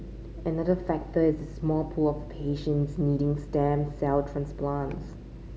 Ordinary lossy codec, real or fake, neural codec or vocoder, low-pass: none; real; none; none